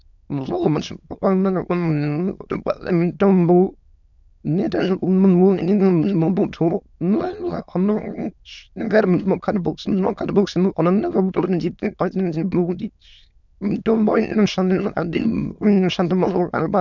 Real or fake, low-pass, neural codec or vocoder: fake; 7.2 kHz; autoencoder, 22.05 kHz, a latent of 192 numbers a frame, VITS, trained on many speakers